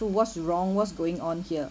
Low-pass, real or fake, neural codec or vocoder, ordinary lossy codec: none; real; none; none